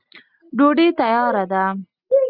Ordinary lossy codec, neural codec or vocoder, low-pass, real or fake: Opus, 64 kbps; none; 5.4 kHz; real